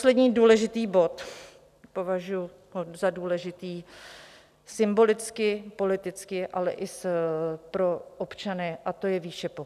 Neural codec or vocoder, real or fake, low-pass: none; real; 14.4 kHz